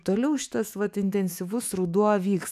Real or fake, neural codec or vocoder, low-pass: fake; autoencoder, 48 kHz, 128 numbers a frame, DAC-VAE, trained on Japanese speech; 14.4 kHz